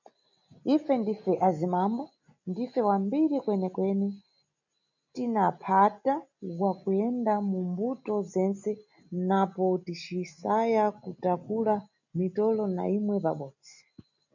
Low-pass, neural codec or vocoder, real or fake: 7.2 kHz; none; real